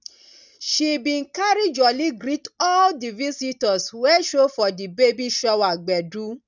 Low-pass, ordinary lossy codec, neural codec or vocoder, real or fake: 7.2 kHz; none; none; real